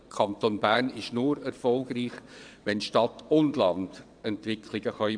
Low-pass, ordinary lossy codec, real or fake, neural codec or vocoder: 9.9 kHz; AAC, 64 kbps; fake; vocoder, 22.05 kHz, 80 mel bands, WaveNeXt